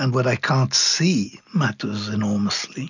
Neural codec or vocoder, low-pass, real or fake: none; 7.2 kHz; real